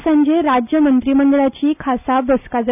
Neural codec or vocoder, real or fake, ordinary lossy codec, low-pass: none; real; none; 3.6 kHz